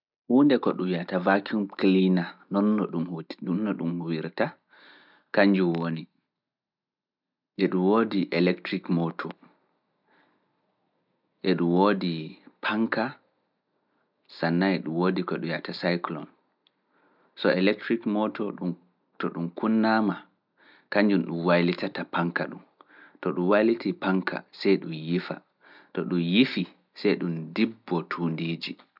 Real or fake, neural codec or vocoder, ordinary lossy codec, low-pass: real; none; none; 5.4 kHz